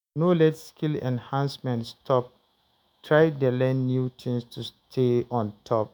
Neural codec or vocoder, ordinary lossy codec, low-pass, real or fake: autoencoder, 48 kHz, 128 numbers a frame, DAC-VAE, trained on Japanese speech; none; none; fake